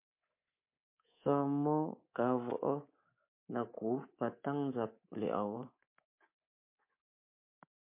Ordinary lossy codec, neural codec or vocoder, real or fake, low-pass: MP3, 24 kbps; codec, 24 kHz, 3.1 kbps, DualCodec; fake; 3.6 kHz